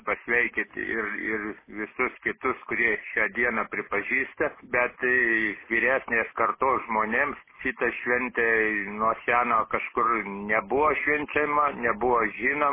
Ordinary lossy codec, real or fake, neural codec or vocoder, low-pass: MP3, 16 kbps; real; none; 3.6 kHz